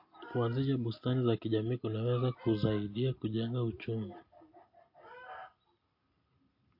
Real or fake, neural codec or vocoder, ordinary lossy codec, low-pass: real; none; MP3, 32 kbps; 5.4 kHz